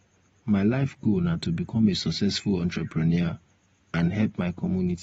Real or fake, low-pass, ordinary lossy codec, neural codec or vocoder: real; 19.8 kHz; AAC, 24 kbps; none